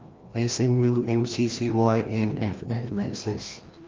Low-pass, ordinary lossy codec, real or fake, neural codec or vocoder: 7.2 kHz; Opus, 24 kbps; fake; codec, 16 kHz, 1 kbps, FreqCodec, larger model